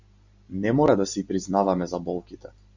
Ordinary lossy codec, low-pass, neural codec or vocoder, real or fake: Opus, 64 kbps; 7.2 kHz; none; real